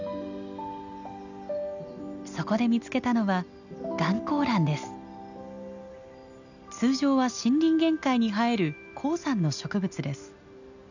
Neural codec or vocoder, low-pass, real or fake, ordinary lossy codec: none; 7.2 kHz; real; none